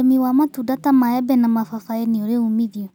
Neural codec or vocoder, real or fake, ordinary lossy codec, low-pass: none; real; none; 19.8 kHz